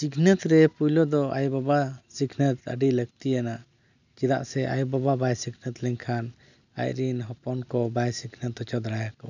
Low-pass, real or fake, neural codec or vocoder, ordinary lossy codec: 7.2 kHz; real; none; none